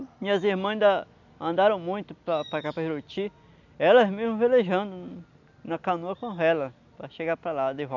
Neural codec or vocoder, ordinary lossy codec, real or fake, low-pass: none; none; real; 7.2 kHz